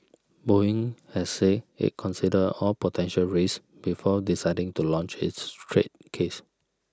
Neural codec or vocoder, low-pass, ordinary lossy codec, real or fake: none; none; none; real